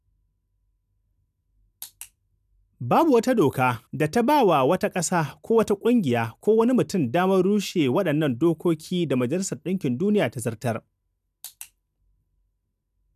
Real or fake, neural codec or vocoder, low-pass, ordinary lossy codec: real; none; 14.4 kHz; none